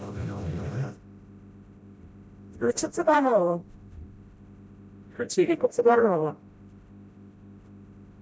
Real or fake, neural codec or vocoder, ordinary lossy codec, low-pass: fake; codec, 16 kHz, 0.5 kbps, FreqCodec, smaller model; none; none